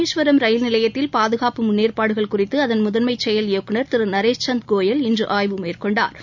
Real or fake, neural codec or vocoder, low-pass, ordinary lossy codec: real; none; 7.2 kHz; none